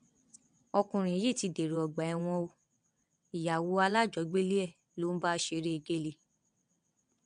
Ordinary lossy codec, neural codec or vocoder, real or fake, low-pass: AAC, 96 kbps; vocoder, 22.05 kHz, 80 mel bands, WaveNeXt; fake; 9.9 kHz